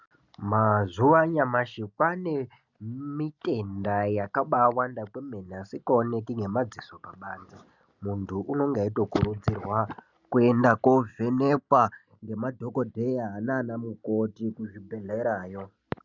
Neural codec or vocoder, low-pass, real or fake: none; 7.2 kHz; real